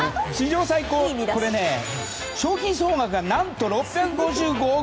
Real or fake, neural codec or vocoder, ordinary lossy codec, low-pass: real; none; none; none